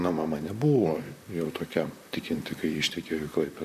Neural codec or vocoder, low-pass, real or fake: vocoder, 44.1 kHz, 128 mel bands, Pupu-Vocoder; 14.4 kHz; fake